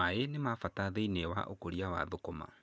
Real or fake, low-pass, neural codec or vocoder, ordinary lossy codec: real; none; none; none